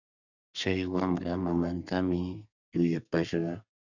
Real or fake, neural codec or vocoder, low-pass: fake; codec, 32 kHz, 1.9 kbps, SNAC; 7.2 kHz